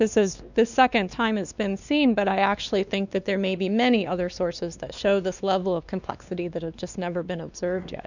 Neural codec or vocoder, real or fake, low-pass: codec, 16 kHz, 2 kbps, X-Codec, WavLM features, trained on Multilingual LibriSpeech; fake; 7.2 kHz